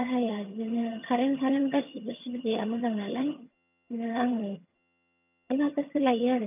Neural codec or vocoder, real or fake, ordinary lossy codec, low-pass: vocoder, 22.05 kHz, 80 mel bands, HiFi-GAN; fake; none; 3.6 kHz